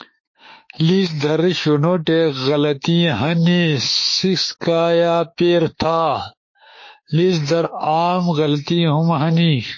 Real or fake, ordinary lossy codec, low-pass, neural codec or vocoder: fake; MP3, 32 kbps; 7.2 kHz; autoencoder, 48 kHz, 32 numbers a frame, DAC-VAE, trained on Japanese speech